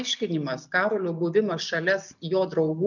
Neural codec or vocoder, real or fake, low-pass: none; real; 7.2 kHz